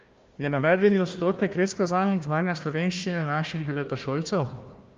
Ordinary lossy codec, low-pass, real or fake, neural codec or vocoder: Opus, 32 kbps; 7.2 kHz; fake; codec, 16 kHz, 1 kbps, FunCodec, trained on Chinese and English, 50 frames a second